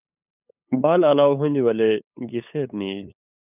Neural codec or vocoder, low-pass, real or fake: codec, 16 kHz, 8 kbps, FunCodec, trained on LibriTTS, 25 frames a second; 3.6 kHz; fake